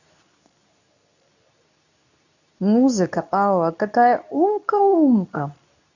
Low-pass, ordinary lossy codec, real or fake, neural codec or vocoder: 7.2 kHz; none; fake; codec, 24 kHz, 0.9 kbps, WavTokenizer, medium speech release version 2